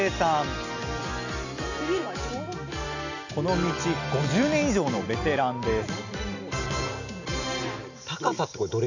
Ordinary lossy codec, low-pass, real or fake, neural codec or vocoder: none; 7.2 kHz; real; none